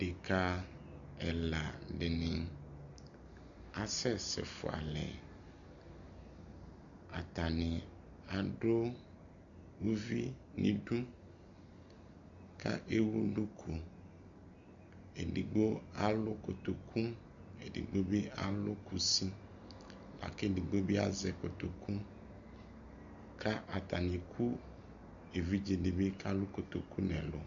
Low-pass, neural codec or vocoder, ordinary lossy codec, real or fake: 7.2 kHz; none; MP3, 96 kbps; real